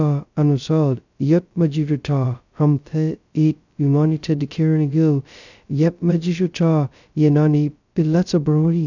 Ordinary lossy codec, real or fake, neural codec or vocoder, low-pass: none; fake; codec, 16 kHz, 0.2 kbps, FocalCodec; 7.2 kHz